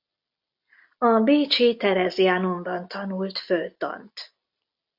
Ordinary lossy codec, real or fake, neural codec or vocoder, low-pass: AAC, 48 kbps; real; none; 5.4 kHz